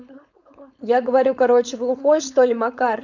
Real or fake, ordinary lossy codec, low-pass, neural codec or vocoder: fake; none; 7.2 kHz; codec, 16 kHz, 4.8 kbps, FACodec